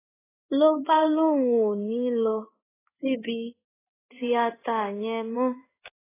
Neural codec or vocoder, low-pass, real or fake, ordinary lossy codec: codec, 16 kHz in and 24 kHz out, 1 kbps, XY-Tokenizer; 3.6 kHz; fake; AAC, 16 kbps